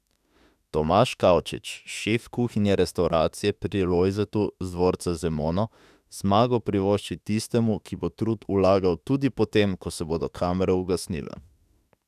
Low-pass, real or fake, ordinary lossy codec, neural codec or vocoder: 14.4 kHz; fake; none; autoencoder, 48 kHz, 32 numbers a frame, DAC-VAE, trained on Japanese speech